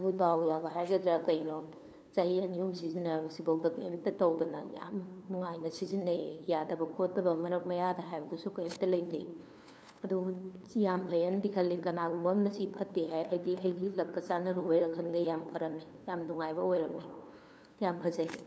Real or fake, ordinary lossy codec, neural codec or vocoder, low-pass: fake; none; codec, 16 kHz, 2 kbps, FunCodec, trained on LibriTTS, 25 frames a second; none